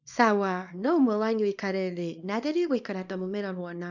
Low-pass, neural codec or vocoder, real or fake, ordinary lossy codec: 7.2 kHz; codec, 24 kHz, 0.9 kbps, WavTokenizer, small release; fake; none